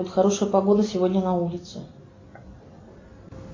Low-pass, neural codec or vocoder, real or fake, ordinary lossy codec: 7.2 kHz; none; real; AAC, 32 kbps